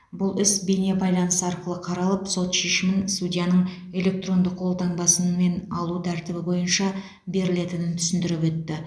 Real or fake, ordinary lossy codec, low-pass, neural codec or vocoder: real; none; none; none